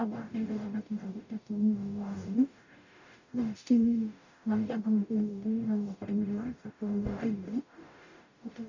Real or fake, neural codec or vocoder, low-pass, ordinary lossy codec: fake; codec, 44.1 kHz, 0.9 kbps, DAC; 7.2 kHz; none